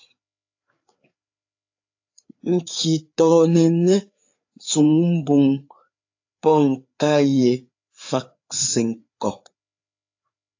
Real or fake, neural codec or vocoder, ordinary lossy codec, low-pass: fake; codec, 16 kHz, 4 kbps, FreqCodec, larger model; AAC, 48 kbps; 7.2 kHz